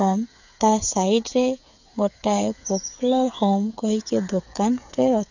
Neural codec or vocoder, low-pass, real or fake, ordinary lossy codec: codec, 16 kHz, 8 kbps, FreqCodec, smaller model; 7.2 kHz; fake; none